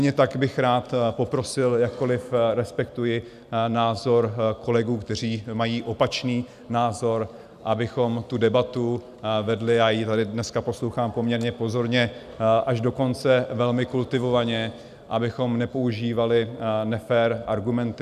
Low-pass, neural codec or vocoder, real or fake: 14.4 kHz; none; real